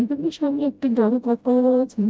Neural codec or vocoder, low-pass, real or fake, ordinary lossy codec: codec, 16 kHz, 0.5 kbps, FreqCodec, smaller model; none; fake; none